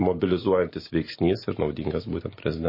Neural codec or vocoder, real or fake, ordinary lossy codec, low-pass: none; real; MP3, 24 kbps; 5.4 kHz